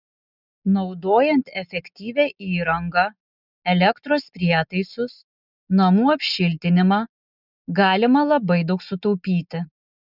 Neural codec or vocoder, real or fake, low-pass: none; real; 5.4 kHz